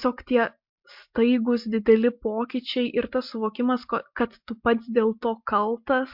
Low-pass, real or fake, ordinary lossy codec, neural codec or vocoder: 5.4 kHz; real; MP3, 48 kbps; none